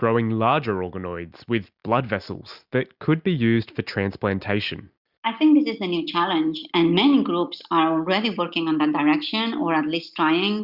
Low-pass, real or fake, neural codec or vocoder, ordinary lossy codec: 5.4 kHz; real; none; Opus, 64 kbps